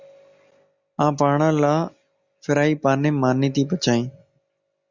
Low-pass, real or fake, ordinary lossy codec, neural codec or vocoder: 7.2 kHz; real; Opus, 64 kbps; none